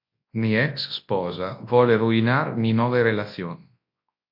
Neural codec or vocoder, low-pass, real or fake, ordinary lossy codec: codec, 24 kHz, 0.9 kbps, WavTokenizer, large speech release; 5.4 kHz; fake; MP3, 32 kbps